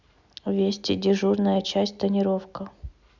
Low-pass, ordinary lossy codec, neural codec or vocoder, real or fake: 7.2 kHz; Opus, 64 kbps; none; real